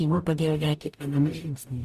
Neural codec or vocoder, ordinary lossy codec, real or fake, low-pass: codec, 44.1 kHz, 0.9 kbps, DAC; Opus, 64 kbps; fake; 14.4 kHz